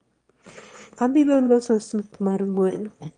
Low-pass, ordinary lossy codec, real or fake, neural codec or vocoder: 9.9 kHz; Opus, 32 kbps; fake; autoencoder, 22.05 kHz, a latent of 192 numbers a frame, VITS, trained on one speaker